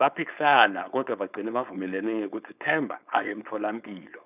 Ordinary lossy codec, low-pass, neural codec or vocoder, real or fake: none; 3.6 kHz; codec, 16 kHz, 4.8 kbps, FACodec; fake